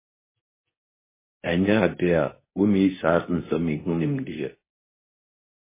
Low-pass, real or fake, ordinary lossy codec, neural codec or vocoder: 3.6 kHz; fake; MP3, 16 kbps; codec, 24 kHz, 0.9 kbps, WavTokenizer, medium speech release version 2